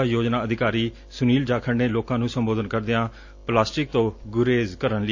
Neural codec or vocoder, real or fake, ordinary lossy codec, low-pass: none; real; AAC, 48 kbps; 7.2 kHz